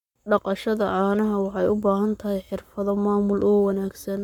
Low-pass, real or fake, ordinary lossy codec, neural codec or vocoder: 19.8 kHz; fake; none; codec, 44.1 kHz, 7.8 kbps, Pupu-Codec